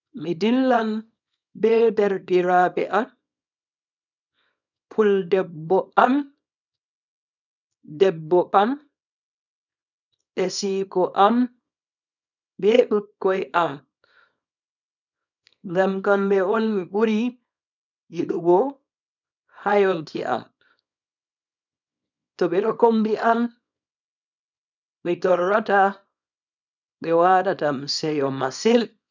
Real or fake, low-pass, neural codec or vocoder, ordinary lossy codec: fake; 7.2 kHz; codec, 24 kHz, 0.9 kbps, WavTokenizer, small release; none